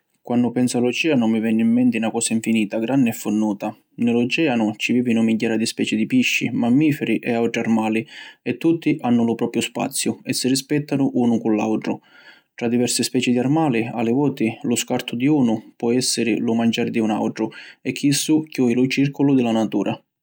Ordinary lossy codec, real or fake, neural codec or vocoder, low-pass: none; real; none; none